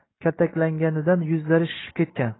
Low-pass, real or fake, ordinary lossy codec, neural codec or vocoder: 7.2 kHz; real; AAC, 16 kbps; none